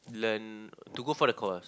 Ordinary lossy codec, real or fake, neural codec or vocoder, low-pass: none; real; none; none